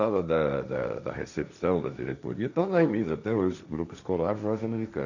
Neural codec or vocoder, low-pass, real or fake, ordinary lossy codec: codec, 16 kHz, 1.1 kbps, Voila-Tokenizer; 7.2 kHz; fake; none